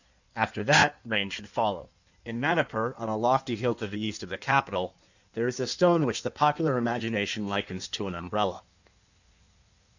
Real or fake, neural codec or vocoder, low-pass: fake; codec, 16 kHz in and 24 kHz out, 1.1 kbps, FireRedTTS-2 codec; 7.2 kHz